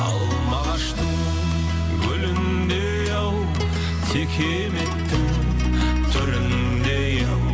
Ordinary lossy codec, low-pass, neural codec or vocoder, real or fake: none; none; none; real